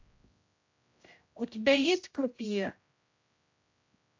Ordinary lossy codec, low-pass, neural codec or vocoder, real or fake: AAC, 48 kbps; 7.2 kHz; codec, 16 kHz, 0.5 kbps, X-Codec, HuBERT features, trained on general audio; fake